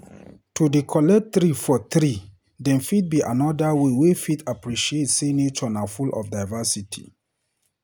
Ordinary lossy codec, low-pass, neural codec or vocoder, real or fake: none; none; none; real